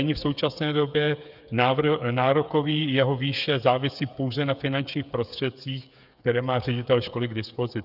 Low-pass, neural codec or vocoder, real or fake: 5.4 kHz; codec, 16 kHz, 8 kbps, FreqCodec, smaller model; fake